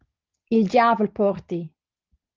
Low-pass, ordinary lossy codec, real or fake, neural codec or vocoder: 7.2 kHz; Opus, 24 kbps; real; none